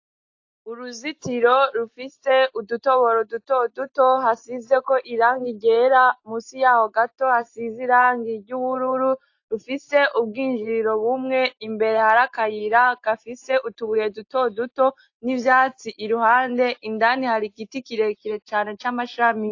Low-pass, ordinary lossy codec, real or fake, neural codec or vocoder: 7.2 kHz; AAC, 48 kbps; real; none